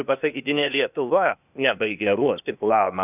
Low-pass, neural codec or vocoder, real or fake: 3.6 kHz; codec, 16 kHz, 0.8 kbps, ZipCodec; fake